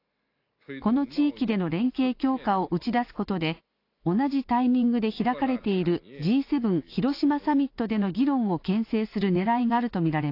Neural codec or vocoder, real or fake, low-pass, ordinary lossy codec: none; real; 5.4 kHz; AAC, 32 kbps